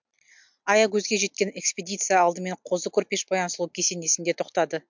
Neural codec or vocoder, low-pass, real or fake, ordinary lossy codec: none; 7.2 kHz; real; MP3, 64 kbps